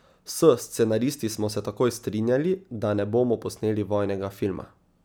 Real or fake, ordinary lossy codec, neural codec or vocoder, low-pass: real; none; none; none